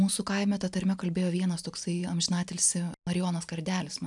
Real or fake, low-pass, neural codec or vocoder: real; 10.8 kHz; none